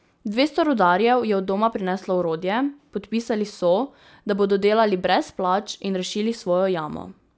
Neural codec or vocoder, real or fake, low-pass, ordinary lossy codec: none; real; none; none